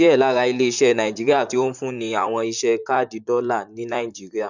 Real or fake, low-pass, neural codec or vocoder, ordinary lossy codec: fake; 7.2 kHz; vocoder, 44.1 kHz, 128 mel bands, Pupu-Vocoder; none